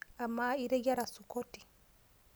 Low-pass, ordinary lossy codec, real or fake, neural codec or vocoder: none; none; real; none